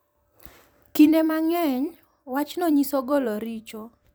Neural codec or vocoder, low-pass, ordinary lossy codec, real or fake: vocoder, 44.1 kHz, 128 mel bands every 256 samples, BigVGAN v2; none; none; fake